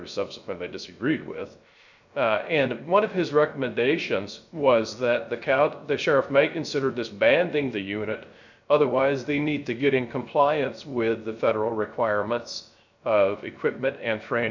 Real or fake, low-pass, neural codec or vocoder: fake; 7.2 kHz; codec, 16 kHz, 0.3 kbps, FocalCodec